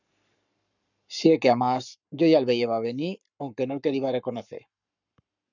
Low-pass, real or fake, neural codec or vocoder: 7.2 kHz; fake; codec, 44.1 kHz, 7.8 kbps, Pupu-Codec